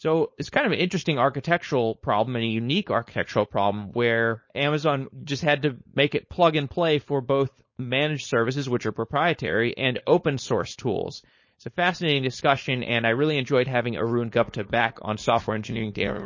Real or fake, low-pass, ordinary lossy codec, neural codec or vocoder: fake; 7.2 kHz; MP3, 32 kbps; codec, 16 kHz, 4.8 kbps, FACodec